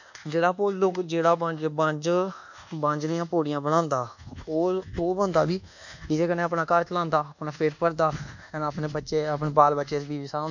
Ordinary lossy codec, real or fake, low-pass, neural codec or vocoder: none; fake; 7.2 kHz; codec, 24 kHz, 1.2 kbps, DualCodec